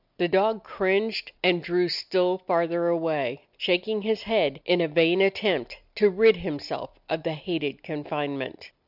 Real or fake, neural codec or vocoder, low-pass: real; none; 5.4 kHz